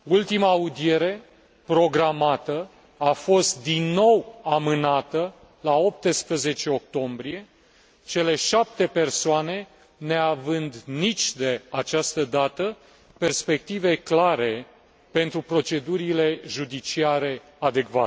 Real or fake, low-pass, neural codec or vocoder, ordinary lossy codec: real; none; none; none